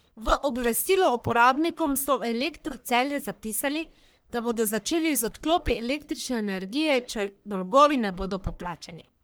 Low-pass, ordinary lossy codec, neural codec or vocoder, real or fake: none; none; codec, 44.1 kHz, 1.7 kbps, Pupu-Codec; fake